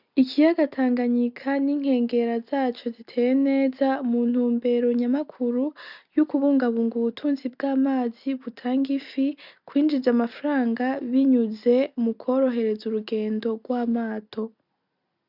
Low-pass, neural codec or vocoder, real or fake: 5.4 kHz; none; real